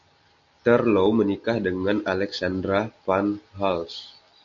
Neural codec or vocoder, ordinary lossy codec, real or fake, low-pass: none; MP3, 64 kbps; real; 7.2 kHz